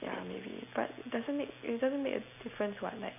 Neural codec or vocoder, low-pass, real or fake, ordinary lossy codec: none; 3.6 kHz; real; none